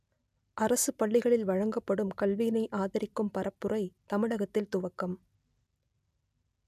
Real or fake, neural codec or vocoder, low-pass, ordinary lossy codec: real; none; 14.4 kHz; none